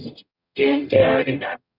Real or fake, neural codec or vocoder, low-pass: fake; codec, 44.1 kHz, 0.9 kbps, DAC; 5.4 kHz